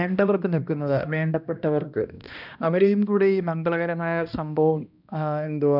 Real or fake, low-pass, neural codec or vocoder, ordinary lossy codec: fake; 5.4 kHz; codec, 16 kHz, 1 kbps, X-Codec, HuBERT features, trained on balanced general audio; none